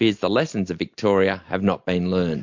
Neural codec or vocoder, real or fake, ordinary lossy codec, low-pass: none; real; MP3, 48 kbps; 7.2 kHz